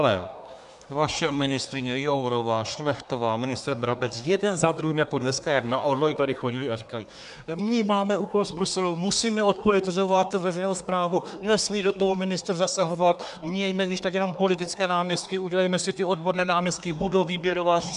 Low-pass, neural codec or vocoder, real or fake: 10.8 kHz; codec, 24 kHz, 1 kbps, SNAC; fake